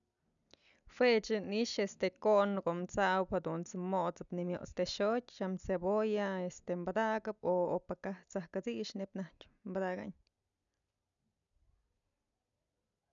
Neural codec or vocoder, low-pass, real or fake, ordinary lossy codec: none; 7.2 kHz; real; none